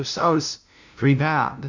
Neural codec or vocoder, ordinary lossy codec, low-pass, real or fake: codec, 16 kHz, 0.5 kbps, FunCodec, trained on LibriTTS, 25 frames a second; MP3, 64 kbps; 7.2 kHz; fake